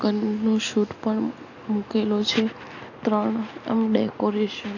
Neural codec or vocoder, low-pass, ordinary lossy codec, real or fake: none; 7.2 kHz; AAC, 48 kbps; real